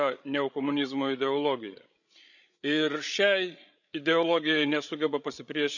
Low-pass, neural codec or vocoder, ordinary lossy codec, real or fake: 7.2 kHz; codec, 16 kHz, 16 kbps, FreqCodec, larger model; none; fake